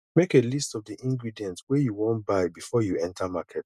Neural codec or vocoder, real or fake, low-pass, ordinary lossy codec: none; real; 14.4 kHz; none